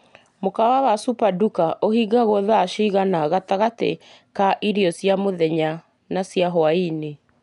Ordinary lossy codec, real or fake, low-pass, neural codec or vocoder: none; real; 10.8 kHz; none